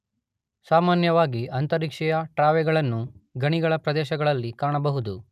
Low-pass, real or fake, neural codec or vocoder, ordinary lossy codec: 14.4 kHz; real; none; none